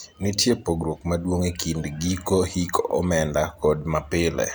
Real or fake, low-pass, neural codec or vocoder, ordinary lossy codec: real; none; none; none